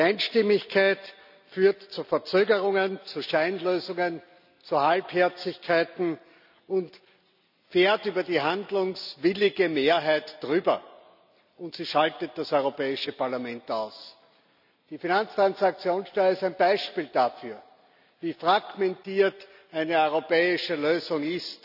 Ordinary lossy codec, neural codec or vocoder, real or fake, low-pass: none; none; real; 5.4 kHz